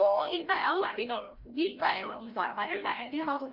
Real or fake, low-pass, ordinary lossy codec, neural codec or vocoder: fake; 5.4 kHz; Opus, 32 kbps; codec, 16 kHz, 0.5 kbps, FreqCodec, larger model